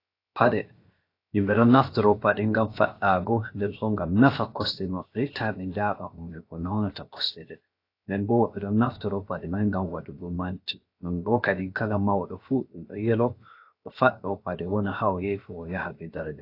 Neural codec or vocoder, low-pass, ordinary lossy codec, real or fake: codec, 16 kHz, 0.7 kbps, FocalCodec; 5.4 kHz; AAC, 32 kbps; fake